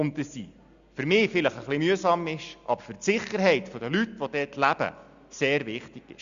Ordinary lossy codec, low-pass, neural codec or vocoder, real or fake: Opus, 64 kbps; 7.2 kHz; none; real